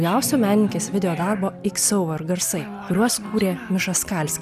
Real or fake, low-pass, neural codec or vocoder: real; 14.4 kHz; none